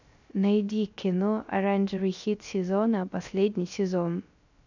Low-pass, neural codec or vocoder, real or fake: 7.2 kHz; codec, 16 kHz, 0.3 kbps, FocalCodec; fake